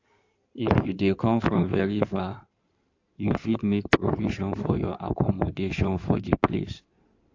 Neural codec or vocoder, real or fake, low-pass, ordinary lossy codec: codec, 16 kHz in and 24 kHz out, 2.2 kbps, FireRedTTS-2 codec; fake; 7.2 kHz; AAC, 48 kbps